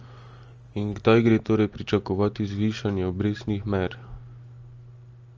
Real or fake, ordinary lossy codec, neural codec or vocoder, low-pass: real; Opus, 24 kbps; none; 7.2 kHz